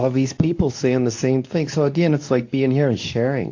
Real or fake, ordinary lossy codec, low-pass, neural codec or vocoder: fake; AAC, 48 kbps; 7.2 kHz; codec, 24 kHz, 0.9 kbps, WavTokenizer, medium speech release version 2